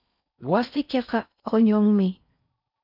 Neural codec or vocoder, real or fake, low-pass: codec, 16 kHz in and 24 kHz out, 0.6 kbps, FocalCodec, streaming, 4096 codes; fake; 5.4 kHz